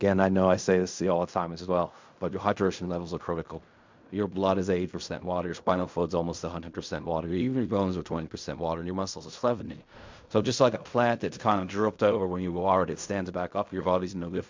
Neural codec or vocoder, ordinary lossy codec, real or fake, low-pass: codec, 16 kHz in and 24 kHz out, 0.4 kbps, LongCat-Audio-Codec, fine tuned four codebook decoder; MP3, 64 kbps; fake; 7.2 kHz